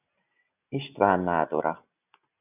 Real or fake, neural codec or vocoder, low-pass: real; none; 3.6 kHz